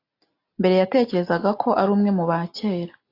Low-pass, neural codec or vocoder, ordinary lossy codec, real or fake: 5.4 kHz; none; AAC, 32 kbps; real